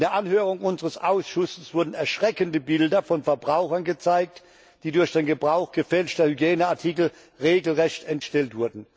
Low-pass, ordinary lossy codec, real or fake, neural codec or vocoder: none; none; real; none